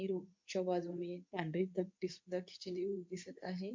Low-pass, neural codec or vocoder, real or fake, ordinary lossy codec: 7.2 kHz; codec, 24 kHz, 0.9 kbps, WavTokenizer, medium speech release version 2; fake; MP3, 48 kbps